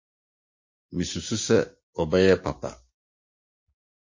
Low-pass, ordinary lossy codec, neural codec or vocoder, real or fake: 7.2 kHz; MP3, 32 kbps; codec, 16 kHz, 6 kbps, DAC; fake